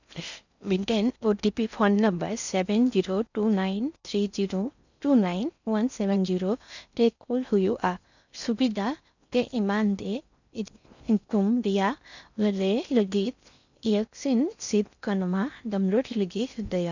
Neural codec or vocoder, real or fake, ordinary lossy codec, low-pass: codec, 16 kHz in and 24 kHz out, 0.6 kbps, FocalCodec, streaming, 4096 codes; fake; none; 7.2 kHz